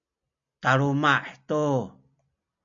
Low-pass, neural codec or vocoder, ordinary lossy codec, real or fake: 7.2 kHz; none; MP3, 64 kbps; real